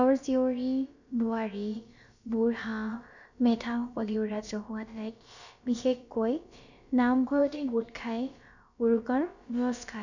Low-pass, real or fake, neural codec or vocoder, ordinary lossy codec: 7.2 kHz; fake; codec, 16 kHz, about 1 kbps, DyCAST, with the encoder's durations; none